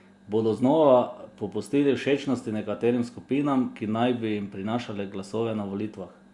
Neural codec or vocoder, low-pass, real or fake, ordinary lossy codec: none; 10.8 kHz; real; Opus, 64 kbps